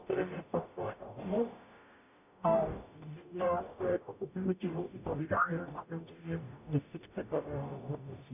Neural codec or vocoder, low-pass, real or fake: codec, 44.1 kHz, 0.9 kbps, DAC; 3.6 kHz; fake